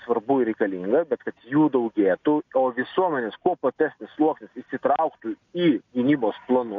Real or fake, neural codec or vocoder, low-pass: real; none; 7.2 kHz